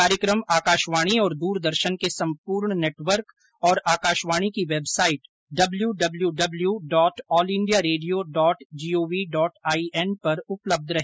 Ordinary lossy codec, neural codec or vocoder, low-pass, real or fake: none; none; none; real